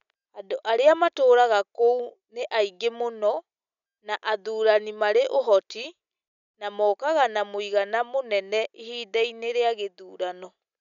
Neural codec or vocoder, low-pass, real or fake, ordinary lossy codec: none; 7.2 kHz; real; none